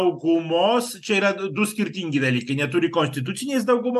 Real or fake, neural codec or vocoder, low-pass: real; none; 14.4 kHz